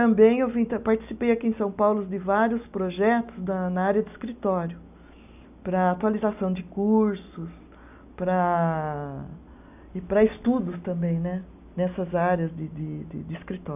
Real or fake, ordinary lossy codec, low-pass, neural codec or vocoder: real; none; 3.6 kHz; none